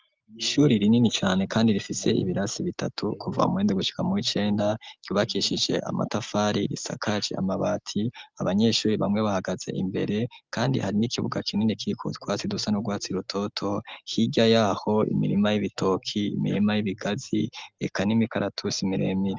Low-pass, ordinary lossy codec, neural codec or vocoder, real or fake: 7.2 kHz; Opus, 32 kbps; none; real